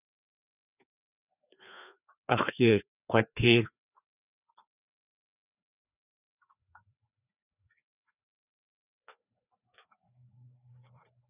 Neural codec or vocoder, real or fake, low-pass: codec, 16 kHz, 2 kbps, FreqCodec, larger model; fake; 3.6 kHz